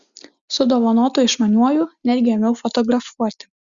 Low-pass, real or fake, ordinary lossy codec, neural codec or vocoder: 7.2 kHz; real; Opus, 64 kbps; none